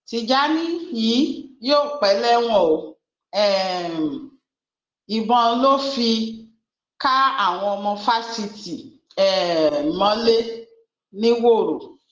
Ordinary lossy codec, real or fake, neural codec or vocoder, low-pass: Opus, 16 kbps; real; none; 7.2 kHz